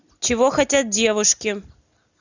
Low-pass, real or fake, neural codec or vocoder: 7.2 kHz; real; none